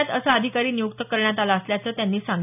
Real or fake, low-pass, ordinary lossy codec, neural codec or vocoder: real; 3.6 kHz; none; none